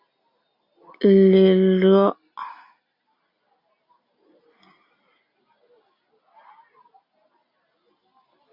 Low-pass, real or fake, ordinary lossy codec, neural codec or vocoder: 5.4 kHz; real; Opus, 64 kbps; none